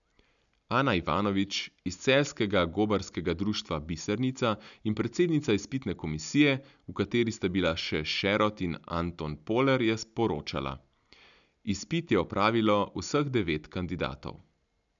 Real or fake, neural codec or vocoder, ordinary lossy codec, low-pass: real; none; none; 7.2 kHz